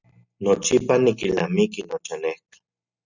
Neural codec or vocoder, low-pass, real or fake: none; 7.2 kHz; real